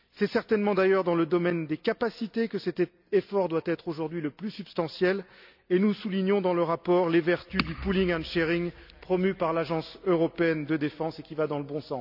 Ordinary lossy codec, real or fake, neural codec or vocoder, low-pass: none; real; none; 5.4 kHz